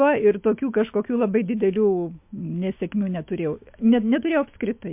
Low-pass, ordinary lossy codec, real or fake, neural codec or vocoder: 3.6 kHz; MP3, 32 kbps; fake; autoencoder, 48 kHz, 128 numbers a frame, DAC-VAE, trained on Japanese speech